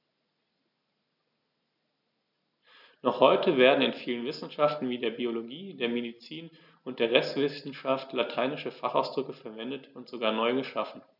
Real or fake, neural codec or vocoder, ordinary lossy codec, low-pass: real; none; none; 5.4 kHz